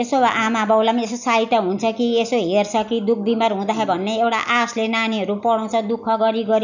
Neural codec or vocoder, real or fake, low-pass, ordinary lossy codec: none; real; 7.2 kHz; none